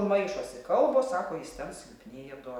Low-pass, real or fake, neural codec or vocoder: 19.8 kHz; real; none